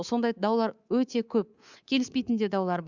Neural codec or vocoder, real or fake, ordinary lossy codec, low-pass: codec, 24 kHz, 3.1 kbps, DualCodec; fake; Opus, 64 kbps; 7.2 kHz